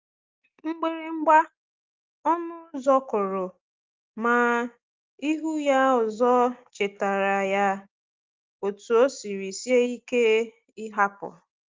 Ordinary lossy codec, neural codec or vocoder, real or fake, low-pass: Opus, 24 kbps; none; real; 7.2 kHz